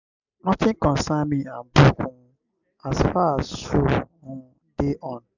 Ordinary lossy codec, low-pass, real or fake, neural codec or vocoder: none; 7.2 kHz; real; none